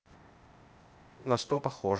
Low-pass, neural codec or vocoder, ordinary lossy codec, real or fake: none; codec, 16 kHz, 0.8 kbps, ZipCodec; none; fake